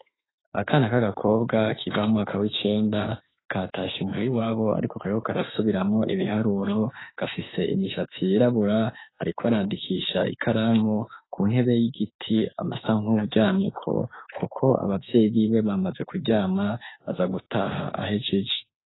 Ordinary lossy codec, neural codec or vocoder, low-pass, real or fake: AAC, 16 kbps; autoencoder, 48 kHz, 32 numbers a frame, DAC-VAE, trained on Japanese speech; 7.2 kHz; fake